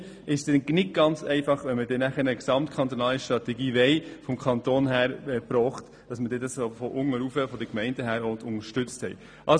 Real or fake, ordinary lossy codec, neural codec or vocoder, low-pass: real; none; none; 9.9 kHz